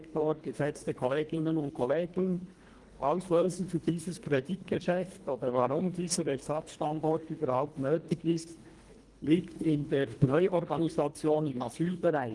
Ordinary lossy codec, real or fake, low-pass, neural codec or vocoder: Opus, 24 kbps; fake; 10.8 kHz; codec, 24 kHz, 1.5 kbps, HILCodec